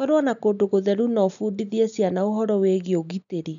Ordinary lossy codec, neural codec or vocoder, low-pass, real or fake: none; none; 7.2 kHz; real